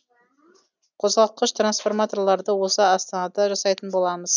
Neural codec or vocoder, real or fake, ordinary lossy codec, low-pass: none; real; none; 7.2 kHz